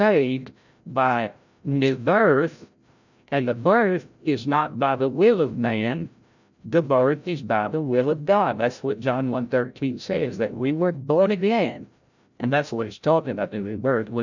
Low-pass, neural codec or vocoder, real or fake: 7.2 kHz; codec, 16 kHz, 0.5 kbps, FreqCodec, larger model; fake